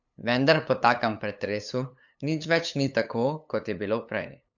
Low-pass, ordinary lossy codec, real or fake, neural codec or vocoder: 7.2 kHz; none; fake; codec, 16 kHz, 8 kbps, FunCodec, trained on LibriTTS, 25 frames a second